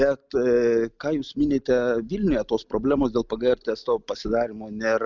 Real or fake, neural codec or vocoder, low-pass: real; none; 7.2 kHz